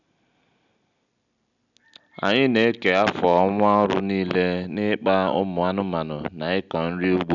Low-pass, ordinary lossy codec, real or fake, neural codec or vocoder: 7.2 kHz; none; real; none